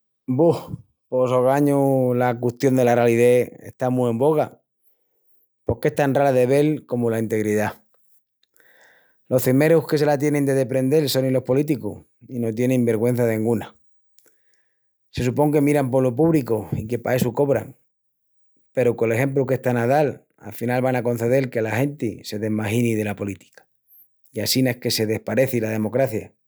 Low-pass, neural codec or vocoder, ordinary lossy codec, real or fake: none; none; none; real